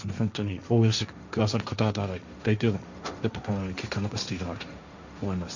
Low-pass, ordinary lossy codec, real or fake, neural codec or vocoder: 7.2 kHz; none; fake; codec, 16 kHz, 1.1 kbps, Voila-Tokenizer